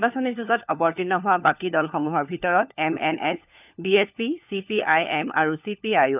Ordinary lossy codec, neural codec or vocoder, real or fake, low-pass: none; codec, 16 kHz, 4 kbps, FunCodec, trained on LibriTTS, 50 frames a second; fake; 3.6 kHz